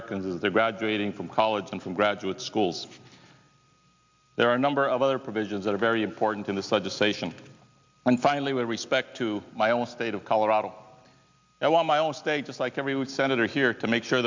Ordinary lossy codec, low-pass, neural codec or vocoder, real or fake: MP3, 64 kbps; 7.2 kHz; none; real